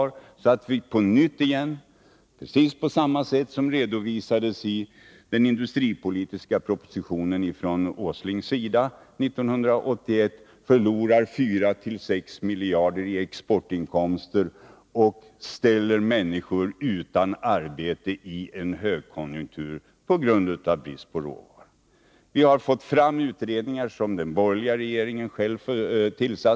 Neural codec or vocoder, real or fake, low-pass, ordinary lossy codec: none; real; none; none